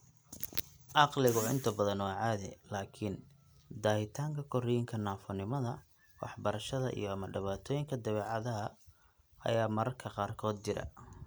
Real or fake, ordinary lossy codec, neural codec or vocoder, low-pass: real; none; none; none